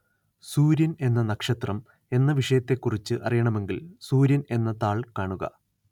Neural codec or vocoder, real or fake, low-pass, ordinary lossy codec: none; real; 19.8 kHz; none